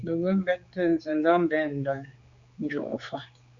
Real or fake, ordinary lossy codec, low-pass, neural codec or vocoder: fake; Opus, 64 kbps; 7.2 kHz; codec, 16 kHz, 4 kbps, X-Codec, HuBERT features, trained on general audio